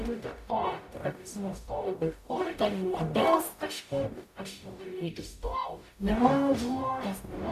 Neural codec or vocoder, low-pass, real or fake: codec, 44.1 kHz, 0.9 kbps, DAC; 14.4 kHz; fake